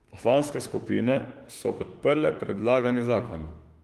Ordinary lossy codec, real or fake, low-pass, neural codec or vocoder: Opus, 24 kbps; fake; 14.4 kHz; autoencoder, 48 kHz, 32 numbers a frame, DAC-VAE, trained on Japanese speech